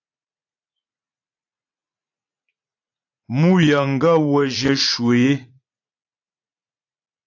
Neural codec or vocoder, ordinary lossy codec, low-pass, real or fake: vocoder, 44.1 kHz, 80 mel bands, Vocos; AAC, 48 kbps; 7.2 kHz; fake